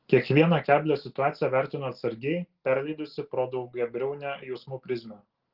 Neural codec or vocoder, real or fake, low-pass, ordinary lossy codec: none; real; 5.4 kHz; Opus, 16 kbps